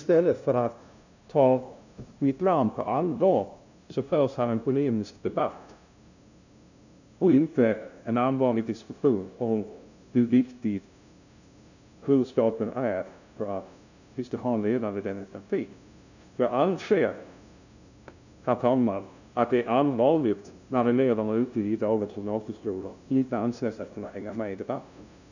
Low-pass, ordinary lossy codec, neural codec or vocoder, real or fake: 7.2 kHz; none; codec, 16 kHz, 0.5 kbps, FunCodec, trained on LibriTTS, 25 frames a second; fake